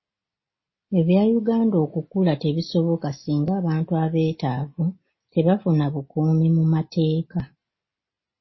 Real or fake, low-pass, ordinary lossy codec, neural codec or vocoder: real; 7.2 kHz; MP3, 24 kbps; none